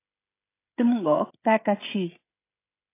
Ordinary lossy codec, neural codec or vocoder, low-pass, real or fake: AAC, 24 kbps; codec, 16 kHz, 16 kbps, FreqCodec, smaller model; 3.6 kHz; fake